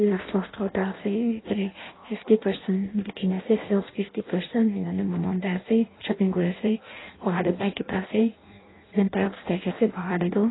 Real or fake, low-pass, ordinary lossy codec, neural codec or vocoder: fake; 7.2 kHz; AAC, 16 kbps; codec, 16 kHz in and 24 kHz out, 0.6 kbps, FireRedTTS-2 codec